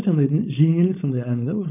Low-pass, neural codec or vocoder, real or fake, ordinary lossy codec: 3.6 kHz; codec, 16 kHz, 4.8 kbps, FACodec; fake; none